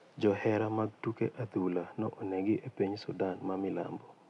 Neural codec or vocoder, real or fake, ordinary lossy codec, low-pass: none; real; none; 10.8 kHz